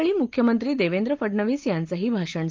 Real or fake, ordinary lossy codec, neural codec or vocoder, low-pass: real; Opus, 32 kbps; none; 7.2 kHz